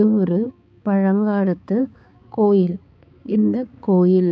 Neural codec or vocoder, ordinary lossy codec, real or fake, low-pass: codec, 16 kHz, 4 kbps, X-Codec, HuBERT features, trained on balanced general audio; none; fake; none